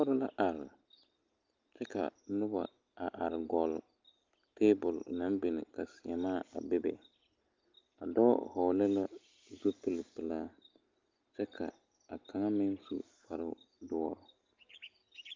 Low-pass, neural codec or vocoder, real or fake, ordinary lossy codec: 7.2 kHz; none; real; Opus, 32 kbps